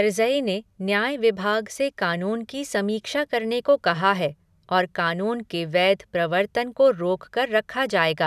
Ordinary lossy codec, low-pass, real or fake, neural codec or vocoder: none; 14.4 kHz; real; none